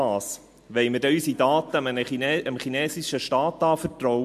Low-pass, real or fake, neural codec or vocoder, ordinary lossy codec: 14.4 kHz; real; none; MP3, 64 kbps